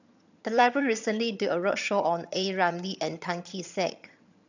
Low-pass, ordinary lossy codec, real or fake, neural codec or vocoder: 7.2 kHz; none; fake; vocoder, 22.05 kHz, 80 mel bands, HiFi-GAN